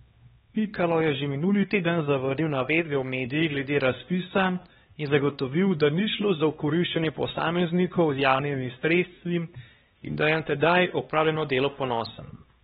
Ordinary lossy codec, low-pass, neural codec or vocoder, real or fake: AAC, 16 kbps; 7.2 kHz; codec, 16 kHz, 2 kbps, X-Codec, HuBERT features, trained on LibriSpeech; fake